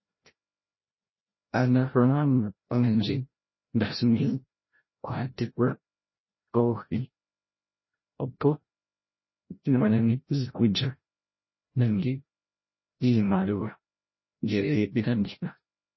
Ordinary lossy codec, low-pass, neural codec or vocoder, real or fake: MP3, 24 kbps; 7.2 kHz; codec, 16 kHz, 0.5 kbps, FreqCodec, larger model; fake